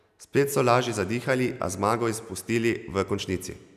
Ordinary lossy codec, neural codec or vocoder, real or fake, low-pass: none; none; real; 14.4 kHz